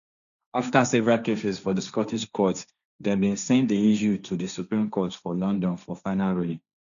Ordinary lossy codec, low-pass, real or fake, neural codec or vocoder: none; 7.2 kHz; fake; codec, 16 kHz, 1.1 kbps, Voila-Tokenizer